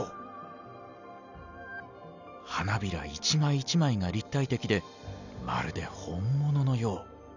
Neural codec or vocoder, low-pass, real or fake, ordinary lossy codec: none; 7.2 kHz; real; none